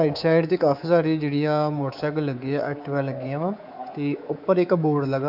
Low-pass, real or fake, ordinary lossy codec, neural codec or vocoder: 5.4 kHz; fake; AAC, 48 kbps; codec, 24 kHz, 3.1 kbps, DualCodec